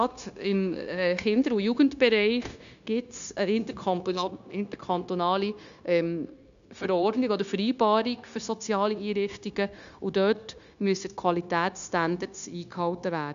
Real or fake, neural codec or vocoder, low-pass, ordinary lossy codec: fake; codec, 16 kHz, 0.9 kbps, LongCat-Audio-Codec; 7.2 kHz; none